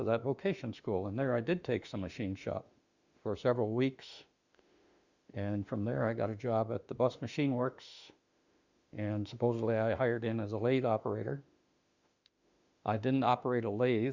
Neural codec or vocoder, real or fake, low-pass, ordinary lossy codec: autoencoder, 48 kHz, 32 numbers a frame, DAC-VAE, trained on Japanese speech; fake; 7.2 kHz; Opus, 64 kbps